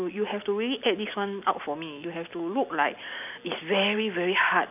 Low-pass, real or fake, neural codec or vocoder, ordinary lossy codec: 3.6 kHz; real; none; none